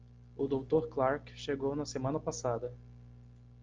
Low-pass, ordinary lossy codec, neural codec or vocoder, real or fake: 7.2 kHz; Opus, 24 kbps; none; real